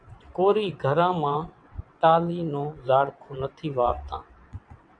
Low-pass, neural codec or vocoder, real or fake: 9.9 kHz; vocoder, 22.05 kHz, 80 mel bands, WaveNeXt; fake